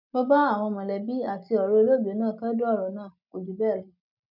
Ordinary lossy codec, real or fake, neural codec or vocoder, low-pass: none; real; none; 5.4 kHz